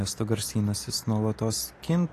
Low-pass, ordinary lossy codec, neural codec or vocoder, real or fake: 14.4 kHz; AAC, 48 kbps; vocoder, 44.1 kHz, 128 mel bands every 256 samples, BigVGAN v2; fake